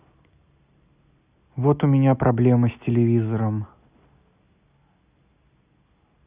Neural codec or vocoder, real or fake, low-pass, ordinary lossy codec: none; real; 3.6 kHz; Opus, 64 kbps